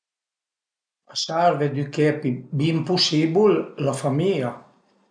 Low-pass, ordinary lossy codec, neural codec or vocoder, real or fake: 9.9 kHz; none; none; real